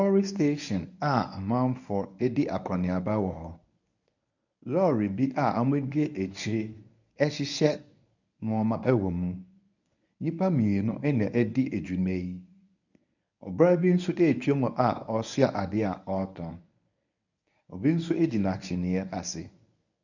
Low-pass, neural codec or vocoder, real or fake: 7.2 kHz; codec, 24 kHz, 0.9 kbps, WavTokenizer, medium speech release version 1; fake